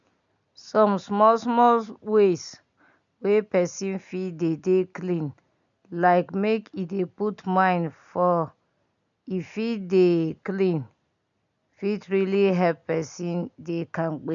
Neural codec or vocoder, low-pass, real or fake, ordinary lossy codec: none; 7.2 kHz; real; none